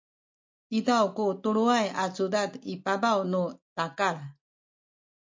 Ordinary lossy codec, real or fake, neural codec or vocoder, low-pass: MP3, 48 kbps; real; none; 7.2 kHz